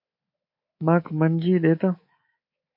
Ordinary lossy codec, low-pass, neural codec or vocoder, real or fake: MP3, 32 kbps; 5.4 kHz; codec, 24 kHz, 3.1 kbps, DualCodec; fake